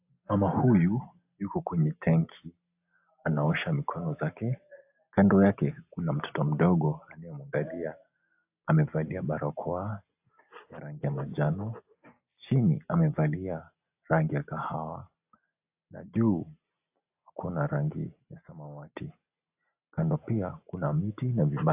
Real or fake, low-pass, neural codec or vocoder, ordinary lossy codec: real; 3.6 kHz; none; AAC, 32 kbps